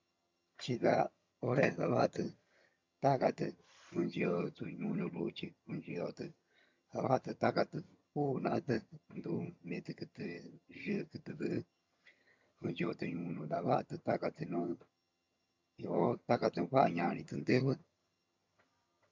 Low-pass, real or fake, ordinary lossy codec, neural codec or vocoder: 7.2 kHz; fake; MP3, 64 kbps; vocoder, 22.05 kHz, 80 mel bands, HiFi-GAN